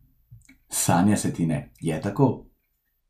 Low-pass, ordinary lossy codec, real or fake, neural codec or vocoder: 14.4 kHz; none; real; none